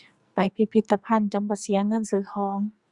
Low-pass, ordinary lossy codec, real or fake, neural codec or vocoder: 10.8 kHz; Opus, 64 kbps; fake; codec, 44.1 kHz, 2.6 kbps, SNAC